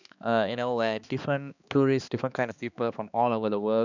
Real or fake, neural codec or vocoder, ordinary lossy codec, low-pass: fake; codec, 16 kHz, 2 kbps, X-Codec, HuBERT features, trained on balanced general audio; none; 7.2 kHz